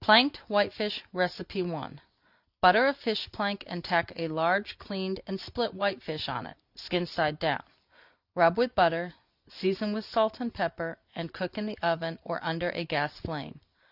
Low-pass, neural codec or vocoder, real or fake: 5.4 kHz; none; real